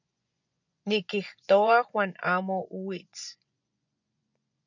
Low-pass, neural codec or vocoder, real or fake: 7.2 kHz; vocoder, 24 kHz, 100 mel bands, Vocos; fake